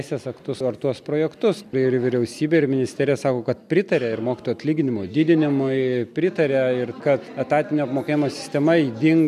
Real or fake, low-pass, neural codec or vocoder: real; 14.4 kHz; none